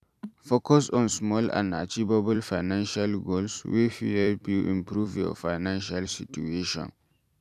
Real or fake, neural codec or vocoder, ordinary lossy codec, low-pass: fake; vocoder, 44.1 kHz, 128 mel bands every 256 samples, BigVGAN v2; none; 14.4 kHz